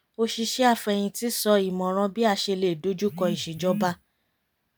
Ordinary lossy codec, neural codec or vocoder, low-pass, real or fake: none; none; none; real